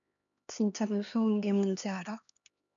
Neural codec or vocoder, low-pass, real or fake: codec, 16 kHz, 2 kbps, X-Codec, HuBERT features, trained on LibriSpeech; 7.2 kHz; fake